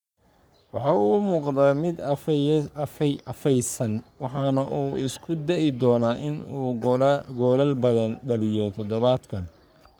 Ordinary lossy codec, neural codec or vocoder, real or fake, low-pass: none; codec, 44.1 kHz, 3.4 kbps, Pupu-Codec; fake; none